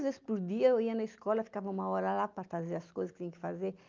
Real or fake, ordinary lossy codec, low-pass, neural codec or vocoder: real; Opus, 24 kbps; 7.2 kHz; none